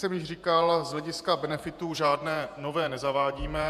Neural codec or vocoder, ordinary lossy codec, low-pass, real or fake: vocoder, 48 kHz, 128 mel bands, Vocos; MP3, 96 kbps; 14.4 kHz; fake